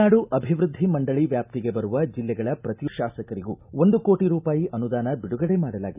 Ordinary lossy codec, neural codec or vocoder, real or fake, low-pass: none; none; real; 3.6 kHz